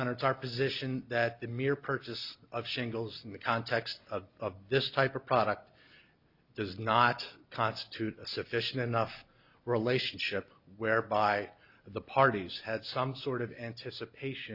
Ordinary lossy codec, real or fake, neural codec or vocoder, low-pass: Opus, 64 kbps; real; none; 5.4 kHz